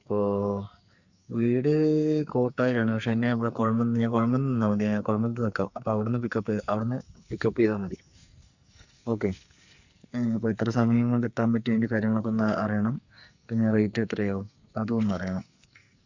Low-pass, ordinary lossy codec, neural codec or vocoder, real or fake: 7.2 kHz; Opus, 64 kbps; codec, 44.1 kHz, 2.6 kbps, SNAC; fake